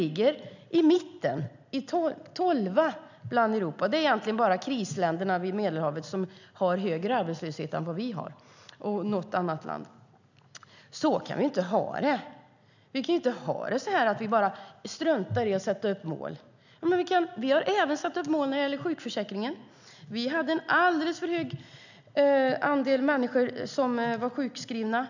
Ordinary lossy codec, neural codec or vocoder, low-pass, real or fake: none; none; 7.2 kHz; real